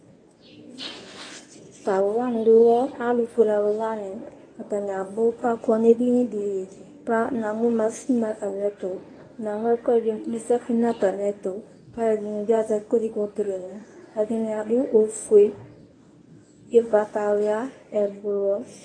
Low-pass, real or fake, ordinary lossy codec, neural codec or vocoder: 9.9 kHz; fake; AAC, 32 kbps; codec, 24 kHz, 0.9 kbps, WavTokenizer, medium speech release version 1